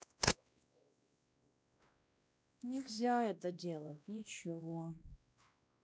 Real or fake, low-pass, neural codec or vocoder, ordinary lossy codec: fake; none; codec, 16 kHz, 1 kbps, X-Codec, WavLM features, trained on Multilingual LibriSpeech; none